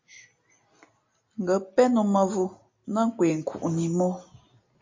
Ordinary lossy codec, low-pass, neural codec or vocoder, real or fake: MP3, 32 kbps; 7.2 kHz; none; real